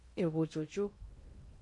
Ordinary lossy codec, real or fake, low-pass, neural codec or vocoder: MP3, 48 kbps; fake; 10.8 kHz; codec, 16 kHz in and 24 kHz out, 0.6 kbps, FocalCodec, streaming, 2048 codes